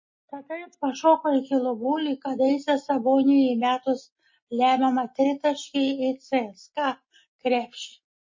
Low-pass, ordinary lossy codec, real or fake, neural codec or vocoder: 7.2 kHz; MP3, 32 kbps; real; none